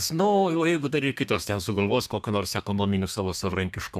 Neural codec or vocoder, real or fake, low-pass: codec, 32 kHz, 1.9 kbps, SNAC; fake; 14.4 kHz